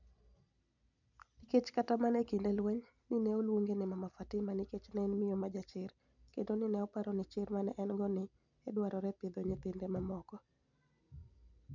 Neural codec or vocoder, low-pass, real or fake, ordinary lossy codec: none; 7.2 kHz; real; none